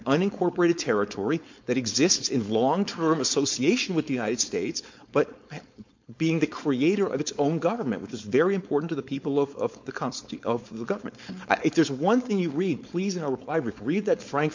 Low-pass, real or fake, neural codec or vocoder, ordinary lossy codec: 7.2 kHz; fake; codec, 16 kHz, 4.8 kbps, FACodec; MP3, 48 kbps